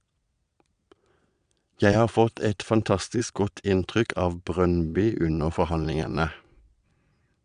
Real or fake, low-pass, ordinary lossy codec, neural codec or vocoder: fake; 9.9 kHz; none; vocoder, 22.05 kHz, 80 mel bands, Vocos